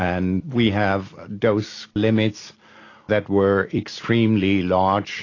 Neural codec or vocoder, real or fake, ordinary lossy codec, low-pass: none; real; AAC, 32 kbps; 7.2 kHz